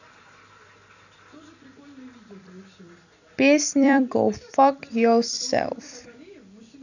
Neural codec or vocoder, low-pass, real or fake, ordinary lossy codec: vocoder, 44.1 kHz, 128 mel bands every 256 samples, BigVGAN v2; 7.2 kHz; fake; none